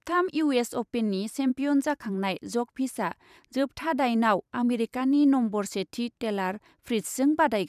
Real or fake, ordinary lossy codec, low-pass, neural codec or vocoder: fake; none; 14.4 kHz; vocoder, 44.1 kHz, 128 mel bands every 512 samples, BigVGAN v2